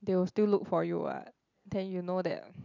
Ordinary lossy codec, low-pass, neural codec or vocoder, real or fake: none; 7.2 kHz; none; real